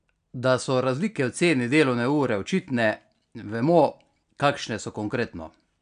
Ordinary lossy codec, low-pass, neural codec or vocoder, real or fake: none; 9.9 kHz; none; real